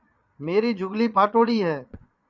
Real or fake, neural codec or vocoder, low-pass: fake; vocoder, 22.05 kHz, 80 mel bands, Vocos; 7.2 kHz